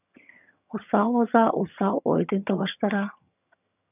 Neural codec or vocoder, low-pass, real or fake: vocoder, 22.05 kHz, 80 mel bands, HiFi-GAN; 3.6 kHz; fake